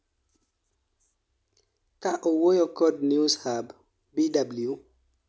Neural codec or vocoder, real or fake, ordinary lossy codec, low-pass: none; real; none; none